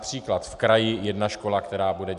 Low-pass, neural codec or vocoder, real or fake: 10.8 kHz; none; real